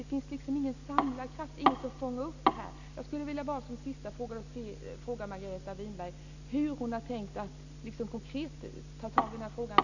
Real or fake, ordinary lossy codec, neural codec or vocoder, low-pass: real; none; none; 7.2 kHz